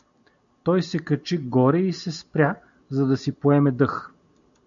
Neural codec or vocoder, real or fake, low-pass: none; real; 7.2 kHz